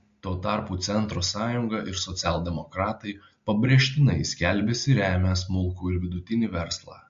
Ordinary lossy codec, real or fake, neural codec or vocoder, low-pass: MP3, 48 kbps; real; none; 7.2 kHz